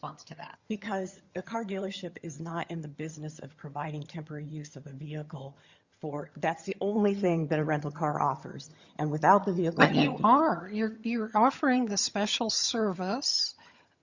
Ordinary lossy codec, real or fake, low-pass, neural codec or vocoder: Opus, 64 kbps; fake; 7.2 kHz; vocoder, 22.05 kHz, 80 mel bands, HiFi-GAN